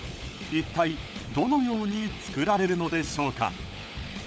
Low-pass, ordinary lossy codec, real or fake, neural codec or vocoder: none; none; fake; codec, 16 kHz, 16 kbps, FunCodec, trained on LibriTTS, 50 frames a second